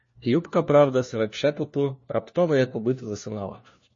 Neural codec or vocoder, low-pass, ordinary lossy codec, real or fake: codec, 16 kHz, 1 kbps, FunCodec, trained on LibriTTS, 50 frames a second; 7.2 kHz; MP3, 32 kbps; fake